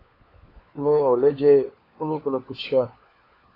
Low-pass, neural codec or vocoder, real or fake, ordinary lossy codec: 5.4 kHz; codec, 16 kHz, 4 kbps, FunCodec, trained on LibriTTS, 50 frames a second; fake; AAC, 24 kbps